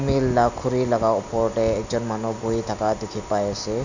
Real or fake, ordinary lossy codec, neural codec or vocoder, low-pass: real; none; none; 7.2 kHz